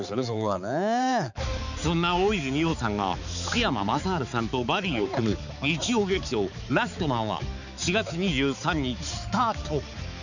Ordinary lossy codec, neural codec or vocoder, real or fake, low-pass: none; codec, 16 kHz, 4 kbps, X-Codec, HuBERT features, trained on balanced general audio; fake; 7.2 kHz